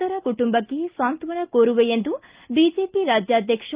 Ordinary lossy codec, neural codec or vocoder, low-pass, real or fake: Opus, 24 kbps; none; 3.6 kHz; real